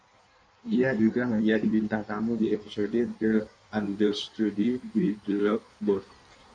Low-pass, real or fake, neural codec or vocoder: 9.9 kHz; fake; codec, 16 kHz in and 24 kHz out, 1.1 kbps, FireRedTTS-2 codec